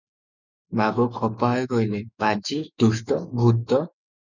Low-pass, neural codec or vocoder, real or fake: 7.2 kHz; autoencoder, 48 kHz, 128 numbers a frame, DAC-VAE, trained on Japanese speech; fake